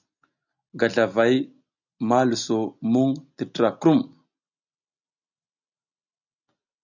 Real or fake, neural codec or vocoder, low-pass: real; none; 7.2 kHz